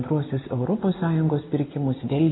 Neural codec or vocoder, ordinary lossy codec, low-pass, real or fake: none; AAC, 16 kbps; 7.2 kHz; real